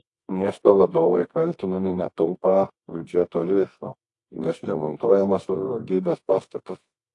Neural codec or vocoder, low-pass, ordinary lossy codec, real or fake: codec, 24 kHz, 0.9 kbps, WavTokenizer, medium music audio release; 10.8 kHz; AAC, 48 kbps; fake